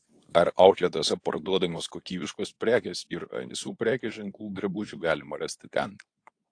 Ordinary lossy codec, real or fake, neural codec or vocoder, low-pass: AAC, 48 kbps; fake; codec, 24 kHz, 0.9 kbps, WavTokenizer, medium speech release version 2; 9.9 kHz